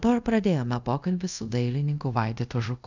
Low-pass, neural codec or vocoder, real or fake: 7.2 kHz; codec, 24 kHz, 0.5 kbps, DualCodec; fake